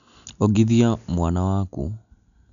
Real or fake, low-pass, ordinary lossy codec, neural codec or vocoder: real; 7.2 kHz; none; none